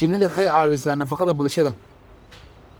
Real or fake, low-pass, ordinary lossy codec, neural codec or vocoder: fake; none; none; codec, 44.1 kHz, 1.7 kbps, Pupu-Codec